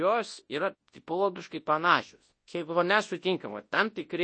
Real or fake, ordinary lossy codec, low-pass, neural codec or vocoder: fake; MP3, 32 kbps; 9.9 kHz; codec, 24 kHz, 0.9 kbps, WavTokenizer, large speech release